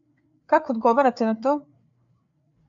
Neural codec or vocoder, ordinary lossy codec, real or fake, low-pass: codec, 16 kHz, 4 kbps, FreqCodec, larger model; AAC, 64 kbps; fake; 7.2 kHz